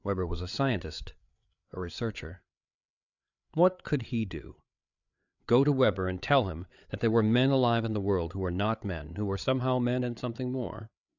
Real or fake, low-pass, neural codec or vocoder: fake; 7.2 kHz; codec, 16 kHz, 8 kbps, FreqCodec, larger model